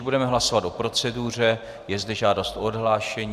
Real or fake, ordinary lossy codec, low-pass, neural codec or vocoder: real; AAC, 96 kbps; 14.4 kHz; none